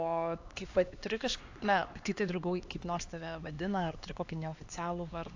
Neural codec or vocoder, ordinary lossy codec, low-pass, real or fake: codec, 16 kHz, 2 kbps, X-Codec, HuBERT features, trained on LibriSpeech; AAC, 48 kbps; 7.2 kHz; fake